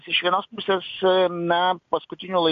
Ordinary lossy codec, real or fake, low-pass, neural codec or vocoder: AAC, 48 kbps; real; 7.2 kHz; none